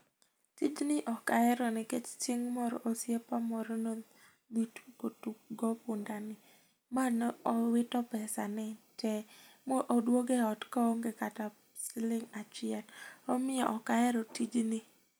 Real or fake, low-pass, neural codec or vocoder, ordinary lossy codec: real; none; none; none